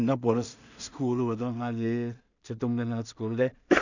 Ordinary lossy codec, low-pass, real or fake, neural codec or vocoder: none; 7.2 kHz; fake; codec, 16 kHz in and 24 kHz out, 0.4 kbps, LongCat-Audio-Codec, two codebook decoder